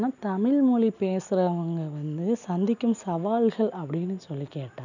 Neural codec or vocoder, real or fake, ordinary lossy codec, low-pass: none; real; none; 7.2 kHz